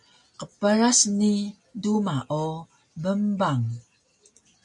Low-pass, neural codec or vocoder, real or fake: 10.8 kHz; none; real